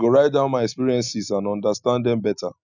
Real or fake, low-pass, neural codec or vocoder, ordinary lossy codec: real; 7.2 kHz; none; none